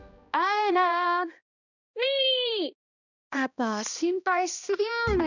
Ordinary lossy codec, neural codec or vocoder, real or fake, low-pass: none; codec, 16 kHz, 1 kbps, X-Codec, HuBERT features, trained on balanced general audio; fake; 7.2 kHz